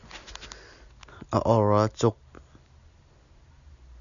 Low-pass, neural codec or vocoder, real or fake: 7.2 kHz; none; real